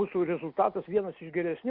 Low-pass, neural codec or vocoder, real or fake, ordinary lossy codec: 5.4 kHz; none; real; Opus, 64 kbps